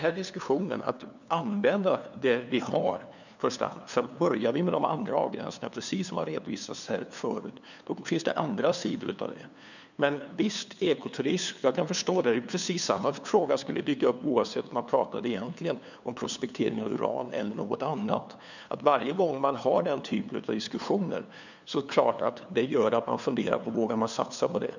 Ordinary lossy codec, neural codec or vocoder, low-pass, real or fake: none; codec, 16 kHz, 2 kbps, FunCodec, trained on LibriTTS, 25 frames a second; 7.2 kHz; fake